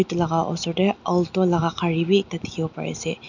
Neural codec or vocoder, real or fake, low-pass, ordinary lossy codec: none; real; 7.2 kHz; none